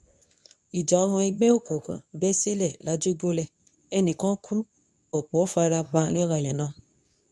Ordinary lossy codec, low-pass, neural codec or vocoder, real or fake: none; none; codec, 24 kHz, 0.9 kbps, WavTokenizer, medium speech release version 2; fake